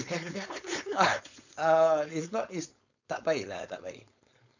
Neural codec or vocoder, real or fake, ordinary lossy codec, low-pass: codec, 16 kHz, 4.8 kbps, FACodec; fake; none; 7.2 kHz